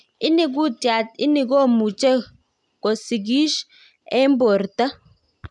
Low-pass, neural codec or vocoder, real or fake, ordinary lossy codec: 10.8 kHz; none; real; none